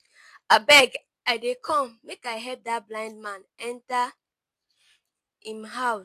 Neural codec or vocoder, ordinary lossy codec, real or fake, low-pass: none; AAC, 64 kbps; real; 14.4 kHz